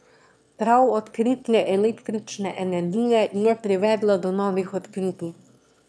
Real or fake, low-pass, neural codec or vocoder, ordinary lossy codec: fake; none; autoencoder, 22.05 kHz, a latent of 192 numbers a frame, VITS, trained on one speaker; none